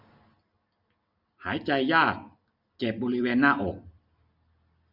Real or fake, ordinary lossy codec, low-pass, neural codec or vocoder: real; none; 5.4 kHz; none